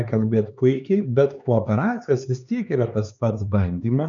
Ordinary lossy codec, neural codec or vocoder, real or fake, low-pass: MP3, 96 kbps; codec, 16 kHz, 4 kbps, X-Codec, HuBERT features, trained on LibriSpeech; fake; 7.2 kHz